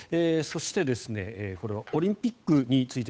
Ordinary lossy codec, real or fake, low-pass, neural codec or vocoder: none; fake; none; codec, 16 kHz, 8 kbps, FunCodec, trained on Chinese and English, 25 frames a second